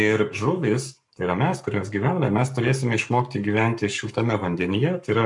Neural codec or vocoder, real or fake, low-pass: codec, 44.1 kHz, 7.8 kbps, Pupu-Codec; fake; 10.8 kHz